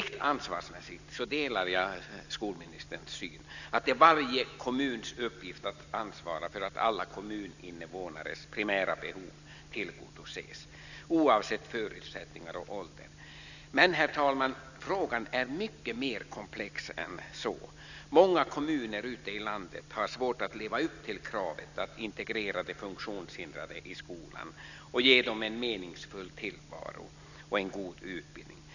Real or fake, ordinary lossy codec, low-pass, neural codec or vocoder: real; none; 7.2 kHz; none